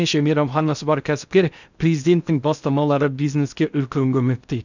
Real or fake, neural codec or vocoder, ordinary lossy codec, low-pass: fake; codec, 16 kHz in and 24 kHz out, 0.6 kbps, FocalCodec, streaming, 2048 codes; none; 7.2 kHz